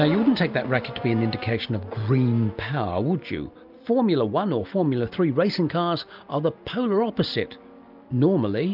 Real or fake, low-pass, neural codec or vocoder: real; 5.4 kHz; none